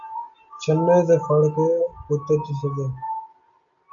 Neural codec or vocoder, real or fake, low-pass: none; real; 7.2 kHz